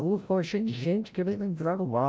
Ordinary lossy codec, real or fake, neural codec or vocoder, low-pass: none; fake; codec, 16 kHz, 0.5 kbps, FreqCodec, larger model; none